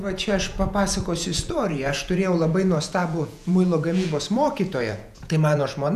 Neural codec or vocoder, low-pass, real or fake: vocoder, 48 kHz, 128 mel bands, Vocos; 14.4 kHz; fake